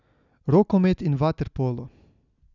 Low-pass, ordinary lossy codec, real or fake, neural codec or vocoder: 7.2 kHz; none; real; none